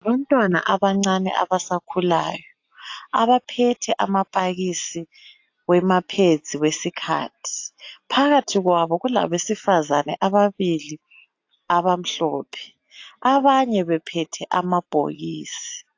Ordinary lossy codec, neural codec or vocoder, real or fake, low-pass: AAC, 48 kbps; none; real; 7.2 kHz